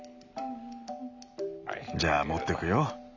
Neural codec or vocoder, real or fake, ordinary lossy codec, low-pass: none; real; none; 7.2 kHz